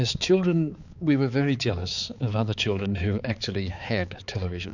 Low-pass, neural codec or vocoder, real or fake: 7.2 kHz; codec, 16 kHz, 4 kbps, X-Codec, HuBERT features, trained on balanced general audio; fake